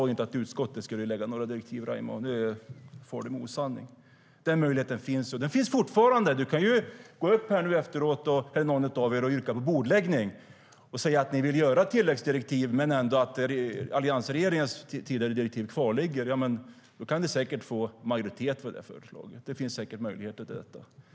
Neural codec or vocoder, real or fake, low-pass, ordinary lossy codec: none; real; none; none